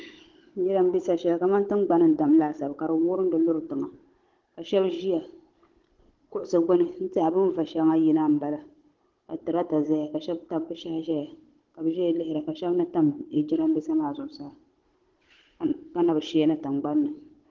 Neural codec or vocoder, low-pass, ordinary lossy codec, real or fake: codec, 16 kHz, 16 kbps, FunCodec, trained on Chinese and English, 50 frames a second; 7.2 kHz; Opus, 16 kbps; fake